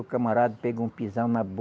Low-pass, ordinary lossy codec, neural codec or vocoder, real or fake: none; none; none; real